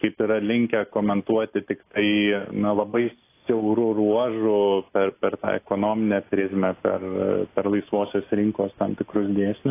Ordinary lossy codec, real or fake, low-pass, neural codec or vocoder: AAC, 24 kbps; real; 3.6 kHz; none